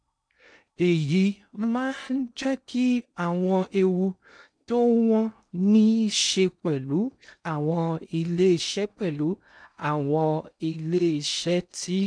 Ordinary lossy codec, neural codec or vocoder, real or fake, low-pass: none; codec, 16 kHz in and 24 kHz out, 0.6 kbps, FocalCodec, streaming, 2048 codes; fake; 9.9 kHz